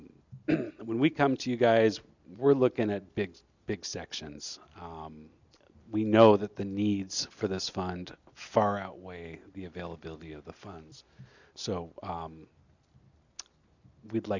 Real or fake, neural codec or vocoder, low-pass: real; none; 7.2 kHz